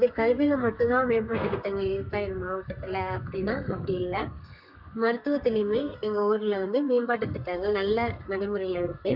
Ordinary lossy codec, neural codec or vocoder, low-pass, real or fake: none; codec, 32 kHz, 1.9 kbps, SNAC; 5.4 kHz; fake